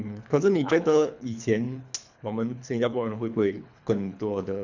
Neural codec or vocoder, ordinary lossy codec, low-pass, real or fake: codec, 24 kHz, 3 kbps, HILCodec; none; 7.2 kHz; fake